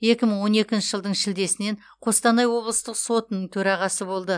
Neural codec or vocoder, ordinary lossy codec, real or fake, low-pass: none; none; real; 9.9 kHz